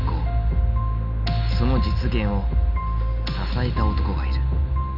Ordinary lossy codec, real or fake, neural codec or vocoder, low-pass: none; real; none; 5.4 kHz